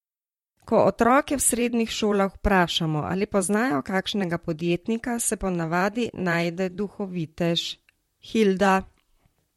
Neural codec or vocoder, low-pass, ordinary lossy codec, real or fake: vocoder, 44.1 kHz, 128 mel bands, Pupu-Vocoder; 19.8 kHz; MP3, 64 kbps; fake